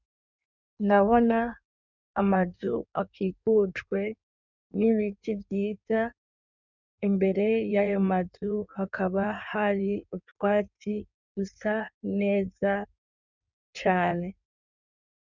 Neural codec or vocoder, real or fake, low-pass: codec, 16 kHz in and 24 kHz out, 1.1 kbps, FireRedTTS-2 codec; fake; 7.2 kHz